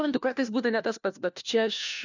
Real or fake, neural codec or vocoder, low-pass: fake; codec, 16 kHz, 1 kbps, FunCodec, trained on LibriTTS, 50 frames a second; 7.2 kHz